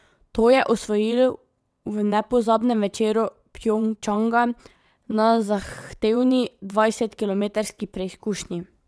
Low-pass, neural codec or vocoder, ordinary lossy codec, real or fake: none; vocoder, 22.05 kHz, 80 mel bands, WaveNeXt; none; fake